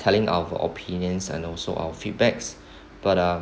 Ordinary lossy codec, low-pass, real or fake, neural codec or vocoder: none; none; real; none